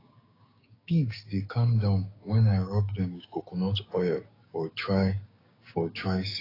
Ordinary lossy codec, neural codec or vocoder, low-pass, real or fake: AAC, 24 kbps; codec, 16 kHz, 6 kbps, DAC; 5.4 kHz; fake